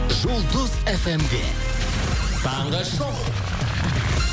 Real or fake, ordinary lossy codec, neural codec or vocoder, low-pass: real; none; none; none